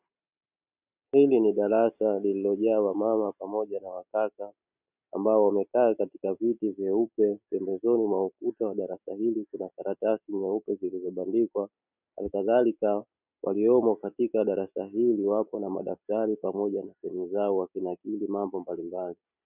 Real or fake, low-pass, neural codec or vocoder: real; 3.6 kHz; none